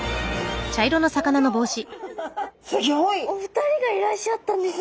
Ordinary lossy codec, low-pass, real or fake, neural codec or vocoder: none; none; real; none